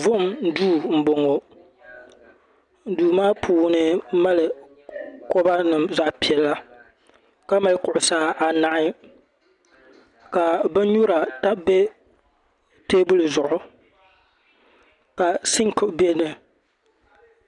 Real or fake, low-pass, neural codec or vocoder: real; 10.8 kHz; none